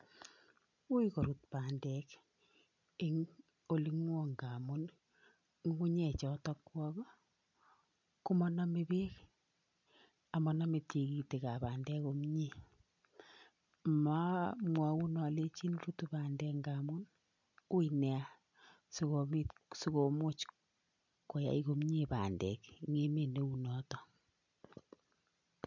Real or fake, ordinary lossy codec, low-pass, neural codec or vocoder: real; none; 7.2 kHz; none